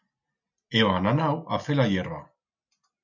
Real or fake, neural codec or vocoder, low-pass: real; none; 7.2 kHz